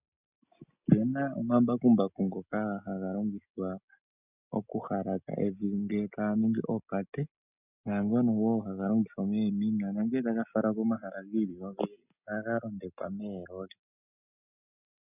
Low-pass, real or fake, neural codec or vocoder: 3.6 kHz; real; none